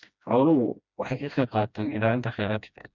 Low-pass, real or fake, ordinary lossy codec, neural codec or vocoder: 7.2 kHz; fake; none; codec, 16 kHz, 1 kbps, FreqCodec, smaller model